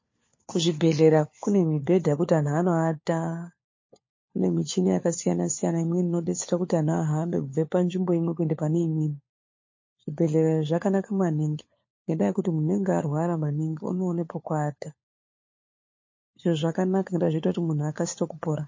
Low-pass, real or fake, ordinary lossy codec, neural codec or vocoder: 7.2 kHz; fake; MP3, 32 kbps; codec, 16 kHz, 4 kbps, FunCodec, trained on LibriTTS, 50 frames a second